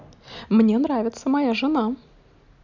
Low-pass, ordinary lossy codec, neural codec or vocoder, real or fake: 7.2 kHz; none; none; real